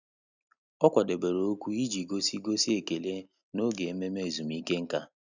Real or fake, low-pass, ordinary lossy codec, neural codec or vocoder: real; 7.2 kHz; none; none